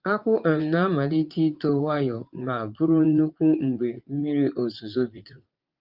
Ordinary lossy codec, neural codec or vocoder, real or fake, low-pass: Opus, 24 kbps; vocoder, 44.1 kHz, 80 mel bands, Vocos; fake; 5.4 kHz